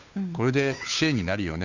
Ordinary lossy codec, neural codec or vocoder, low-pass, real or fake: none; codec, 16 kHz, 2 kbps, FunCodec, trained on Chinese and English, 25 frames a second; 7.2 kHz; fake